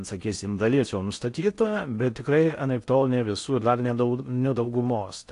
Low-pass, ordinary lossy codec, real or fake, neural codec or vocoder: 10.8 kHz; AAC, 48 kbps; fake; codec, 16 kHz in and 24 kHz out, 0.6 kbps, FocalCodec, streaming, 4096 codes